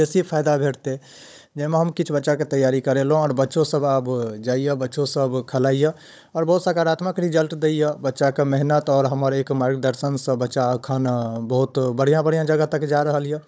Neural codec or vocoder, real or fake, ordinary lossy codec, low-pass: codec, 16 kHz, 16 kbps, FunCodec, trained on Chinese and English, 50 frames a second; fake; none; none